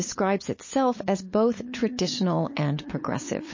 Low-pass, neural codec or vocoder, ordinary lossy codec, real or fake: 7.2 kHz; codec, 16 kHz, 8 kbps, FunCodec, trained on LibriTTS, 25 frames a second; MP3, 32 kbps; fake